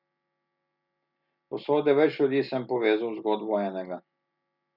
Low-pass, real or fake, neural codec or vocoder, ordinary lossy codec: 5.4 kHz; real; none; none